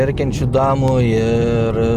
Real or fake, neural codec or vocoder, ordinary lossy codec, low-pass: real; none; Opus, 24 kbps; 14.4 kHz